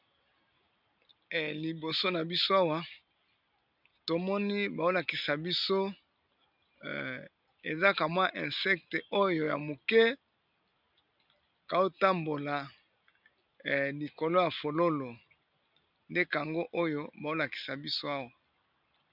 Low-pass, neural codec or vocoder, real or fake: 5.4 kHz; none; real